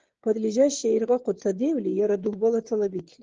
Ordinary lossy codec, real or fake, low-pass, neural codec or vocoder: Opus, 16 kbps; fake; 7.2 kHz; codec, 16 kHz, 16 kbps, FreqCodec, smaller model